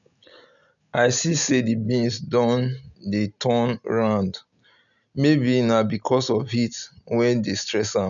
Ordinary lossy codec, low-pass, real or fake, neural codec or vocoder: none; 7.2 kHz; real; none